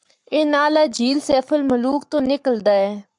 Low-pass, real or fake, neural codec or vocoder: 10.8 kHz; fake; codec, 44.1 kHz, 7.8 kbps, Pupu-Codec